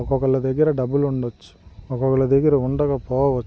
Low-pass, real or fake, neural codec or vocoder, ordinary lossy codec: none; real; none; none